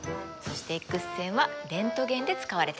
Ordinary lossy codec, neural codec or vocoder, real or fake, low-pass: none; none; real; none